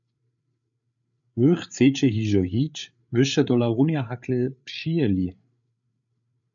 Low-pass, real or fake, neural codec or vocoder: 7.2 kHz; fake; codec, 16 kHz, 8 kbps, FreqCodec, larger model